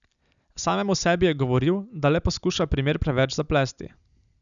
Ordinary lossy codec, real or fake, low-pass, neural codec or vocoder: none; real; 7.2 kHz; none